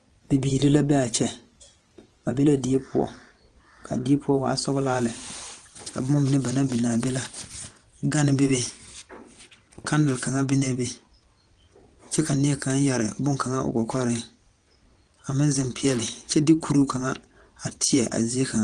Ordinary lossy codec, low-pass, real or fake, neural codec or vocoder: Opus, 24 kbps; 9.9 kHz; fake; vocoder, 22.05 kHz, 80 mel bands, WaveNeXt